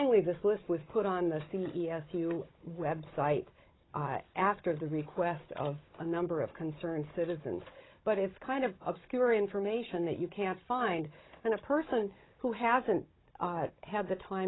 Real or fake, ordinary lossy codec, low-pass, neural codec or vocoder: fake; AAC, 16 kbps; 7.2 kHz; codec, 16 kHz, 16 kbps, FunCodec, trained on Chinese and English, 50 frames a second